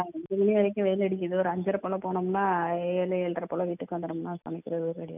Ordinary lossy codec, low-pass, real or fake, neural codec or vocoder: none; 3.6 kHz; real; none